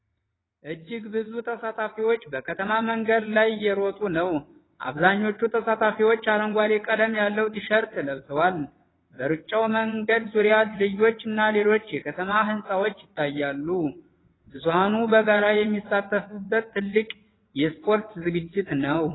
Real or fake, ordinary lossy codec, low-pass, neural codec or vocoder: fake; AAC, 16 kbps; 7.2 kHz; vocoder, 22.05 kHz, 80 mel bands, WaveNeXt